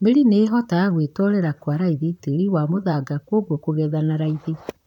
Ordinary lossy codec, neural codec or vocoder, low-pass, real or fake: none; vocoder, 44.1 kHz, 128 mel bands, Pupu-Vocoder; 19.8 kHz; fake